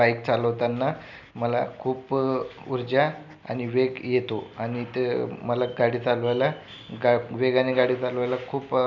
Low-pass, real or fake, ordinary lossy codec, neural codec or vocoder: 7.2 kHz; real; none; none